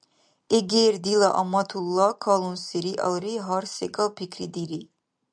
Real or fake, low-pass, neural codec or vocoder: real; 9.9 kHz; none